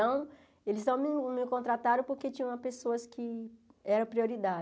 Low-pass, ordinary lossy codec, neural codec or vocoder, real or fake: none; none; none; real